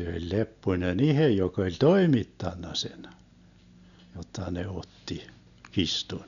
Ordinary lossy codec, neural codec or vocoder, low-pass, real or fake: none; none; 7.2 kHz; real